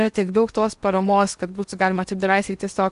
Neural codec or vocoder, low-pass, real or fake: codec, 16 kHz in and 24 kHz out, 0.8 kbps, FocalCodec, streaming, 65536 codes; 10.8 kHz; fake